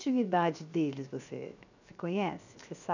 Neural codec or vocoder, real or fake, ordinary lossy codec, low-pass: codec, 16 kHz, 0.7 kbps, FocalCodec; fake; none; 7.2 kHz